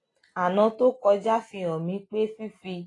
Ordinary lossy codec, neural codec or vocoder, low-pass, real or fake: AAC, 32 kbps; none; 10.8 kHz; real